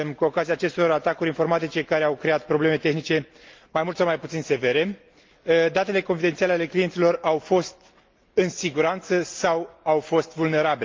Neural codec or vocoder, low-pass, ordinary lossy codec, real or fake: none; 7.2 kHz; Opus, 24 kbps; real